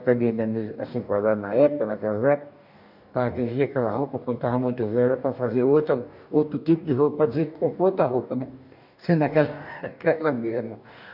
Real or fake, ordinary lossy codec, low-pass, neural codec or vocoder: fake; none; 5.4 kHz; codec, 44.1 kHz, 2.6 kbps, DAC